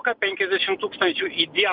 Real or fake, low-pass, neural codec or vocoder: real; 5.4 kHz; none